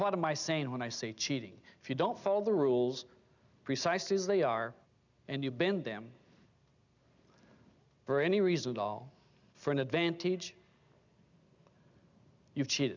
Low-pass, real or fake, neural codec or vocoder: 7.2 kHz; real; none